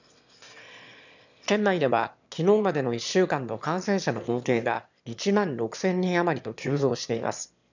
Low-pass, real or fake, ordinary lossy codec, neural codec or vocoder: 7.2 kHz; fake; none; autoencoder, 22.05 kHz, a latent of 192 numbers a frame, VITS, trained on one speaker